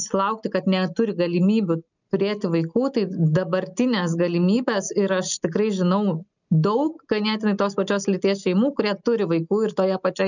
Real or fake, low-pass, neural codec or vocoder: real; 7.2 kHz; none